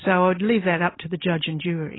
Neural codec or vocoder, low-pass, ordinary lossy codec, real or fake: none; 7.2 kHz; AAC, 16 kbps; real